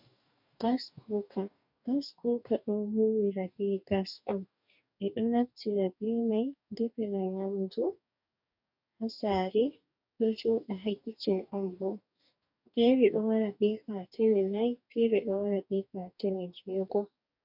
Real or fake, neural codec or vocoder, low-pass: fake; codec, 44.1 kHz, 2.6 kbps, DAC; 5.4 kHz